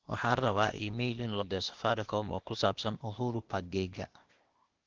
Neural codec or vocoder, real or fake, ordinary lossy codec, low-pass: codec, 16 kHz, 0.8 kbps, ZipCodec; fake; Opus, 16 kbps; 7.2 kHz